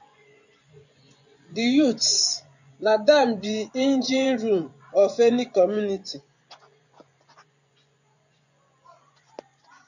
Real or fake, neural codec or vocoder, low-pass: fake; vocoder, 24 kHz, 100 mel bands, Vocos; 7.2 kHz